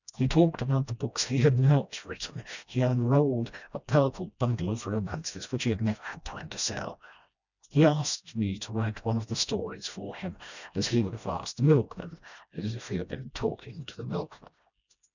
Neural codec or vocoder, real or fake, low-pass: codec, 16 kHz, 1 kbps, FreqCodec, smaller model; fake; 7.2 kHz